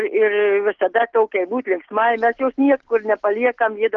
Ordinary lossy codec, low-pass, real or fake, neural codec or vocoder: Opus, 16 kbps; 7.2 kHz; real; none